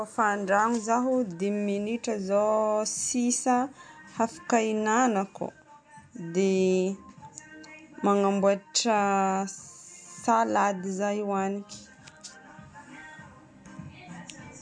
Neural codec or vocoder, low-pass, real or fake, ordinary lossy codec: none; 9.9 kHz; real; MP3, 64 kbps